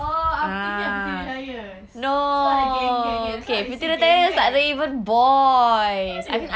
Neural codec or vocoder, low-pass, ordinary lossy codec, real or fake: none; none; none; real